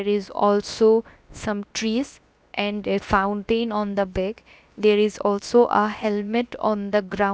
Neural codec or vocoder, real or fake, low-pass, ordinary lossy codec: codec, 16 kHz, about 1 kbps, DyCAST, with the encoder's durations; fake; none; none